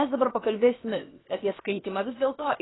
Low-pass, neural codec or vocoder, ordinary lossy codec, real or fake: 7.2 kHz; codec, 16 kHz, about 1 kbps, DyCAST, with the encoder's durations; AAC, 16 kbps; fake